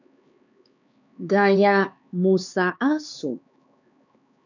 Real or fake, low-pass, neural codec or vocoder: fake; 7.2 kHz; codec, 16 kHz, 4 kbps, X-Codec, HuBERT features, trained on LibriSpeech